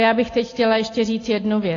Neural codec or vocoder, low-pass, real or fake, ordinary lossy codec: none; 7.2 kHz; real; AAC, 32 kbps